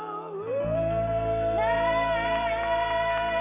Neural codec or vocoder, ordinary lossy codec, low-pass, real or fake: none; none; 3.6 kHz; real